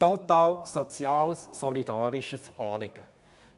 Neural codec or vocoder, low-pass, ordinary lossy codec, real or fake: codec, 24 kHz, 1 kbps, SNAC; 10.8 kHz; none; fake